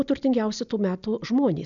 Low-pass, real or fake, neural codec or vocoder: 7.2 kHz; real; none